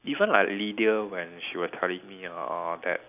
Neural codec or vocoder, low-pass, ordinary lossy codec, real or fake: none; 3.6 kHz; none; real